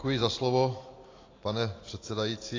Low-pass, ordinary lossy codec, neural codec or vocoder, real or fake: 7.2 kHz; AAC, 32 kbps; none; real